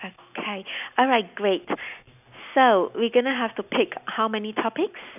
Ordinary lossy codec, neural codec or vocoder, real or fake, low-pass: none; none; real; 3.6 kHz